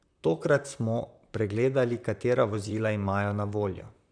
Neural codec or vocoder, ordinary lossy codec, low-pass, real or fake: vocoder, 44.1 kHz, 128 mel bands, Pupu-Vocoder; none; 9.9 kHz; fake